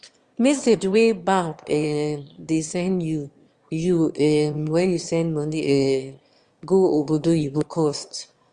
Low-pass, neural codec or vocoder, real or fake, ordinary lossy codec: 9.9 kHz; autoencoder, 22.05 kHz, a latent of 192 numbers a frame, VITS, trained on one speaker; fake; Opus, 32 kbps